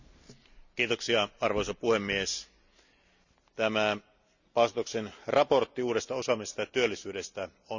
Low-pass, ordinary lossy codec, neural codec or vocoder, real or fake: 7.2 kHz; none; none; real